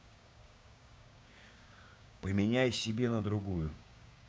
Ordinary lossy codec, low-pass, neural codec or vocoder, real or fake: none; none; codec, 16 kHz, 6 kbps, DAC; fake